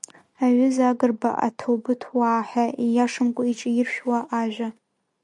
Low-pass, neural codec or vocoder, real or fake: 10.8 kHz; none; real